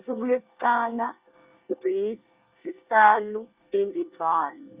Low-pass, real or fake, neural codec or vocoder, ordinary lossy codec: 3.6 kHz; fake; codec, 24 kHz, 1 kbps, SNAC; Opus, 64 kbps